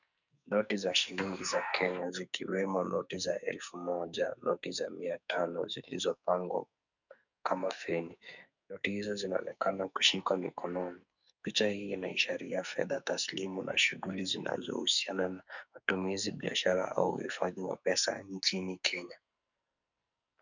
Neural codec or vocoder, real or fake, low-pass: codec, 44.1 kHz, 2.6 kbps, SNAC; fake; 7.2 kHz